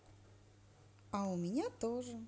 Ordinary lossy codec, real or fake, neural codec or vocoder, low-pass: none; real; none; none